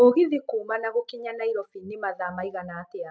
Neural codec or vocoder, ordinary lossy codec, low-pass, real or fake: none; none; none; real